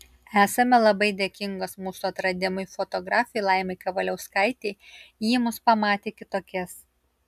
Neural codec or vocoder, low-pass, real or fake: none; 14.4 kHz; real